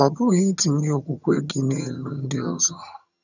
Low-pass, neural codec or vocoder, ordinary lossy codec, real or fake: 7.2 kHz; vocoder, 22.05 kHz, 80 mel bands, HiFi-GAN; none; fake